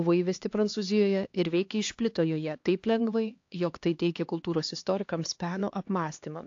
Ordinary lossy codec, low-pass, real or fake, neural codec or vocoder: AAC, 48 kbps; 7.2 kHz; fake; codec, 16 kHz, 2 kbps, X-Codec, HuBERT features, trained on LibriSpeech